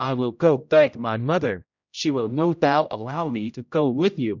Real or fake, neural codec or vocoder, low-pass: fake; codec, 16 kHz, 0.5 kbps, X-Codec, HuBERT features, trained on general audio; 7.2 kHz